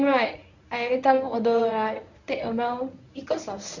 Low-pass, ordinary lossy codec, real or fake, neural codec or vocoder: 7.2 kHz; none; fake; codec, 24 kHz, 0.9 kbps, WavTokenizer, medium speech release version 1